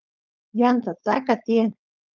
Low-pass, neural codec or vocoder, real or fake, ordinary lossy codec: 7.2 kHz; codec, 16 kHz, 4.8 kbps, FACodec; fake; Opus, 24 kbps